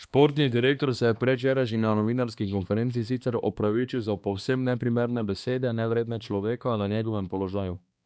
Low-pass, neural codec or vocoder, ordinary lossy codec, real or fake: none; codec, 16 kHz, 2 kbps, X-Codec, HuBERT features, trained on balanced general audio; none; fake